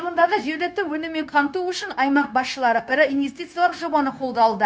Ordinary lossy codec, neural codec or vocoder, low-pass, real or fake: none; codec, 16 kHz, 0.9 kbps, LongCat-Audio-Codec; none; fake